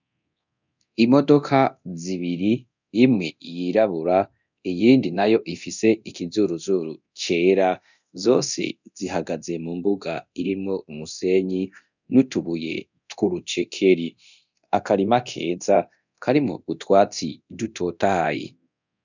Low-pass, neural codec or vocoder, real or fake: 7.2 kHz; codec, 24 kHz, 0.9 kbps, DualCodec; fake